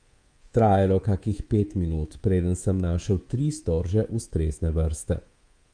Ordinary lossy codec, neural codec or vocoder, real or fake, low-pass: Opus, 32 kbps; codec, 24 kHz, 3.1 kbps, DualCodec; fake; 9.9 kHz